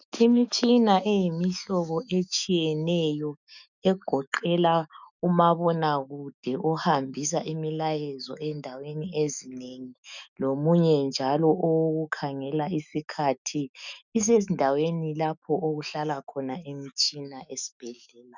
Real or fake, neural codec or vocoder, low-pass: fake; autoencoder, 48 kHz, 128 numbers a frame, DAC-VAE, trained on Japanese speech; 7.2 kHz